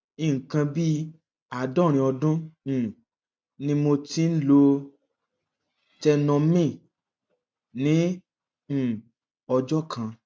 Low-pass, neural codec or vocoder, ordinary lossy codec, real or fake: none; none; none; real